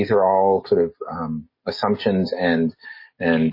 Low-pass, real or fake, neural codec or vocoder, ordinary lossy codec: 5.4 kHz; real; none; MP3, 24 kbps